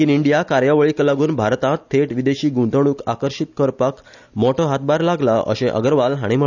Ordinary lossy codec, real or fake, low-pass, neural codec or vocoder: none; real; 7.2 kHz; none